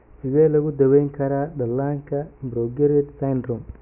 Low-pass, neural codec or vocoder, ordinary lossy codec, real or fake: 3.6 kHz; none; none; real